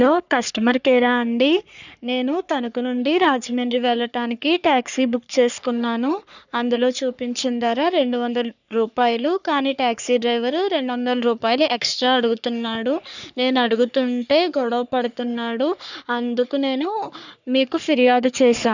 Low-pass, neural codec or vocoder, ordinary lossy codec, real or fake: 7.2 kHz; codec, 44.1 kHz, 3.4 kbps, Pupu-Codec; none; fake